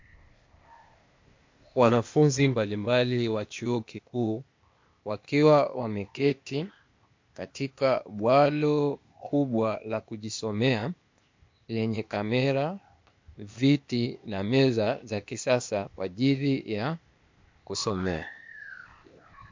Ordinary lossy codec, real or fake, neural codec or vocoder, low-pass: MP3, 48 kbps; fake; codec, 16 kHz, 0.8 kbps, ZipCodec; 7.2 kHz